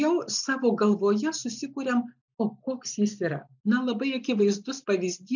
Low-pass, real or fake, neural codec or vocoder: 7.2 kHz; real; none